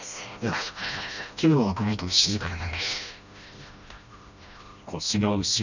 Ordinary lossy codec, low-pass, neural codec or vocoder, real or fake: none; 7.2 kHz; codec, 16 kHz, 1 kbps, FreqCodec, smaller model; fake